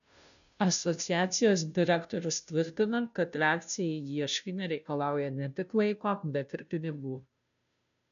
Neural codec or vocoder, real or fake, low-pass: codec, 16 kHz, 0.5 kbps, FunCodec, trained on Chinese and English, 25 frames a second; fake; 7.2 kHz